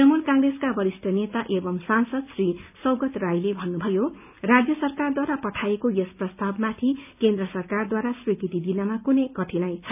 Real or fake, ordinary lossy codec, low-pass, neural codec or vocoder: real; none; 3.6 kHz; none